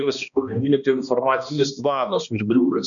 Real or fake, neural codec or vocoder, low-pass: fake; codec, 16 kHz, 1 kbps, X-Codec, HuBERT features, trained on balanced general audio; 7.2 kHz